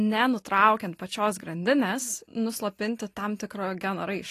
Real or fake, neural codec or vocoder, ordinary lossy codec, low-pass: real; none; AAC, 48 kbps; 14.4 kHz